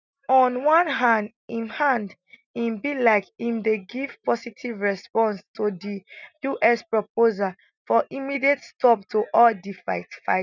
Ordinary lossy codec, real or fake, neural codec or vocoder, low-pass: none; real; none; 7.2 kHz